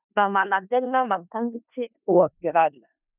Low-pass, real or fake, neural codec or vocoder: 3.6 kHz; fake; codec, 16 kHz in and 24 kHz out, 0.4 kbps, LongCat-Audio-Codec, four codebook decoder